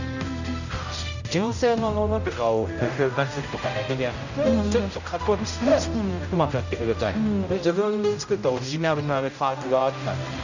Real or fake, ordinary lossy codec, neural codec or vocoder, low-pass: fake; none; codec, 16 kHz, 0.5 kbps, X-Codec, HuBERT features, trained on general audio; 7.2 kHz